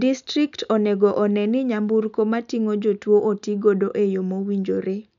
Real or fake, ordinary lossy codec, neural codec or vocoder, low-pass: real; none; none; 7.2 kHz